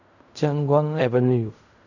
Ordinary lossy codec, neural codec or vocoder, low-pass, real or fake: none; codec, 16 kHz in and 24 kHz out, 0.4 kbps, LongCat-Audio-Codec, fine tuned four codebook decoder; 7.2 kHz; fake